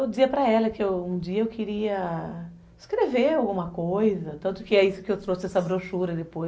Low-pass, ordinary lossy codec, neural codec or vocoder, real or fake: none; none; none; real